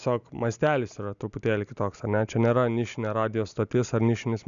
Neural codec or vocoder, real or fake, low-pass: none; real; 7.2 kHz